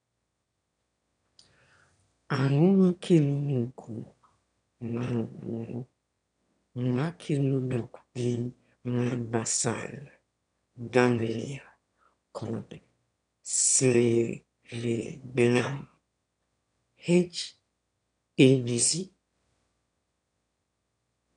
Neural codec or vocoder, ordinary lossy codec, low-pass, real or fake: autoencoder, 22.05 kHz, a latent of 192 numbers a frame, VITS, trained on one speaker; none; 9.9 kHz; fake